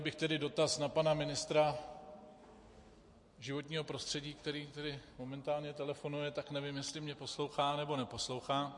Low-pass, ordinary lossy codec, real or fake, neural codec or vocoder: 10.8 kHz; MP3, 48 kbps; real; none